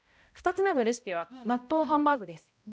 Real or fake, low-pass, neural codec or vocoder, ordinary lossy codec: fake; none; codec, 16 kHz, 0.5 kbps, X-Codec, HuBERT features, trained on balanced general audio; none